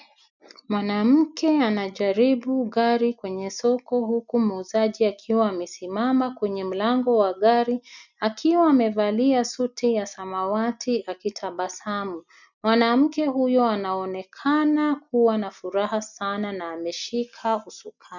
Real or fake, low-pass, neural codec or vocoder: real; 7.2 kHz; none